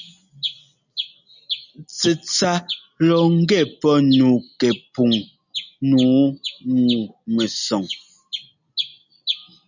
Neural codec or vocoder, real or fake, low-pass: none; real; 7.2 kHz